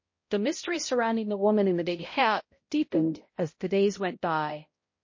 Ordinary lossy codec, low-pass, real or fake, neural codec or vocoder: MP3, 32 kbps; 7.2 kHz; fake; codec, 16 kHz, 0.5 kbps, X-Codec, HuBERT features, trained on balanced general audio